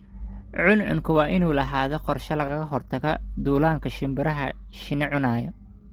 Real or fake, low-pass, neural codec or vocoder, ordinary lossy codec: real; 19.8 kHz; none; Opus, 16 kbps